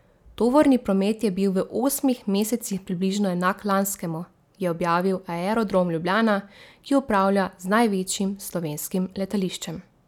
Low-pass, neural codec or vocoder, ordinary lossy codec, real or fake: 19.8 kHz; none; none; real